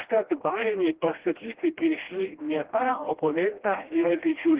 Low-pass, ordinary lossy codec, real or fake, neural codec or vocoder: 3.6 kHz; Opus, 16 kbps; fake; codec, 16 kHz, 1 kbps, FreqCodec, smaller model